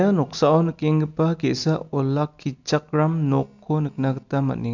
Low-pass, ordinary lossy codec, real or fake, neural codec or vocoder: 7.2 kHz; none; real; none